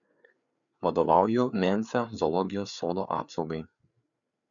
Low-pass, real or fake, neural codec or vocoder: 7.2 kHz; fake; codec, 16 kHz, 4 kbps, FreqCodec, larger model